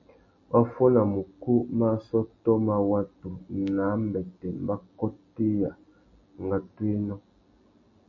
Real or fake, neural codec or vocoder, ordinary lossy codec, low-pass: real; none; MP3, 32 kbps; 7.2 kHz